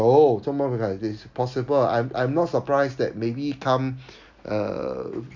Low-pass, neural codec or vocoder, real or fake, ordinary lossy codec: 7.2 kHz; none; real; MP3, 64 kbps